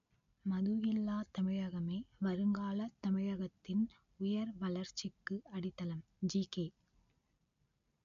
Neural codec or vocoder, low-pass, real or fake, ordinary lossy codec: none; 7.2 kHz; real; MP3, 64 kbps